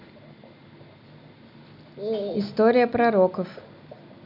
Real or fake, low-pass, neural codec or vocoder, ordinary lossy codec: real; 5.4 kHz; none; none